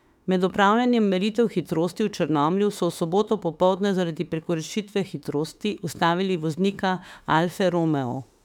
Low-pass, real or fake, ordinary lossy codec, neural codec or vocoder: 19.8 kHz; fake; none; autoencoder, 48 kHz, 32 numbers a frame, DAC-VAE, trained on Japanese speech